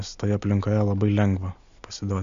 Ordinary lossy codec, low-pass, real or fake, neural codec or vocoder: Opus, 64 kbps; 7.2 kHz; real; none